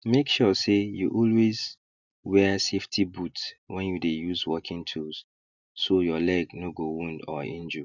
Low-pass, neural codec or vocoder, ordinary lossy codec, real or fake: 7.2 kHz; none; none; real